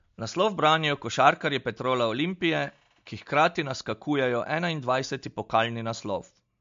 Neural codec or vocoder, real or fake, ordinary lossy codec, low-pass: none; real; MP3, 48 kbps; 7.2 kHz